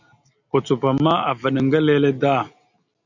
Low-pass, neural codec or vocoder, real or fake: 7.2 kHz; none; real